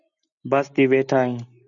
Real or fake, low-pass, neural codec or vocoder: real; 7.2 kHz; none